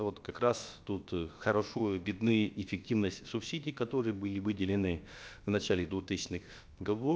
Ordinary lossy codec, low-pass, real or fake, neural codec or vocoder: none; none; fake; codec, 16 kHz, about 1 kbps, DyCAST, with the encoder's durations